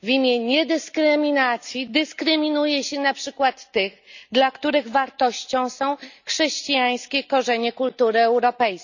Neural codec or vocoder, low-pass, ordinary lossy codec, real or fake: none; 7.2 kHz; none; real